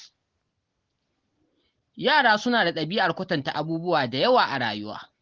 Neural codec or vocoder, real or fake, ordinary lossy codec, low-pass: none; real; Opus, 16 kbps; 7.2 kHz